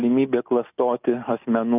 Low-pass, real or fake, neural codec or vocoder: 3.6 kHz; real; none